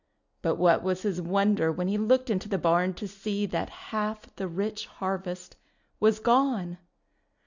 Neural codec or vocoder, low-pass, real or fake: none; 7.2 kHz; real